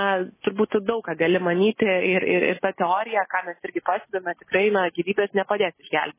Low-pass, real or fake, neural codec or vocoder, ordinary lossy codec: 3.6 kHz; real; none; MP3, 16 kbps